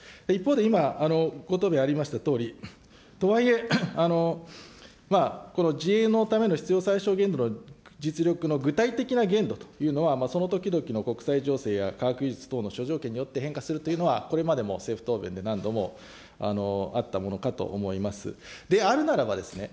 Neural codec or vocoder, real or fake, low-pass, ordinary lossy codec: none; real; none; none